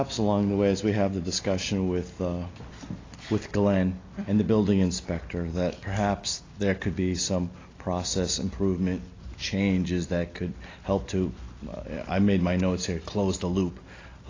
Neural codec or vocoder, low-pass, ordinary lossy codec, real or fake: none; 7.2 kHz; AAC, 32 kbps; real